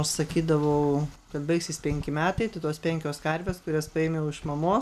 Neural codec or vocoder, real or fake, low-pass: none; real; 14.4 kHz